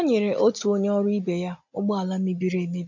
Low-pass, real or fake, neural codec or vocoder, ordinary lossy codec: 7.2 kHz; real; none; none